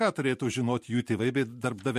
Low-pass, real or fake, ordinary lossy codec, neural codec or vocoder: 14.4 kHz; fake; MP3, 64 kbps; vocoder, 44.1 kHz, 128 mel bands every 512 samples, BigVGAN v2